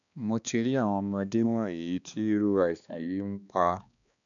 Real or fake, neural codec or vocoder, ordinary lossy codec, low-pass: fake; codec, 16 kHz, 2 kbps, X-Codec, HuBERT features, trained on balanced general audio; MP3, 96 kbps; 7.2 kHz